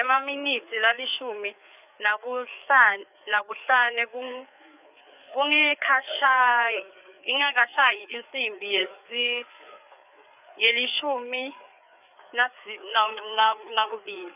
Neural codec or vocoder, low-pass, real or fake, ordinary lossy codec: codec, 16 kHz, 4 kbps, FreqCodec, larger model; 3.6 kHz; fake; none